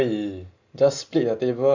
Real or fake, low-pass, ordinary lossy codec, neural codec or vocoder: real; 7.2 kHz; Opus, 64 kbps; none